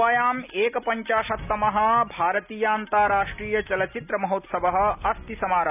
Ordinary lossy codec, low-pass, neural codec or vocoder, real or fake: none; 3.6 kHz; none; real